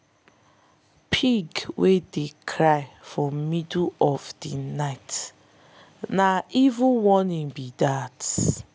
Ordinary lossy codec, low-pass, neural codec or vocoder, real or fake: none; none; none; real